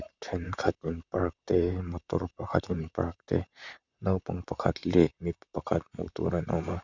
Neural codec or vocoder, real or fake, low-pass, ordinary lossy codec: vocoder, 44.1 kHz, 128 mel bands, Pupu-Vocoder; fake; 7.2 kHz; none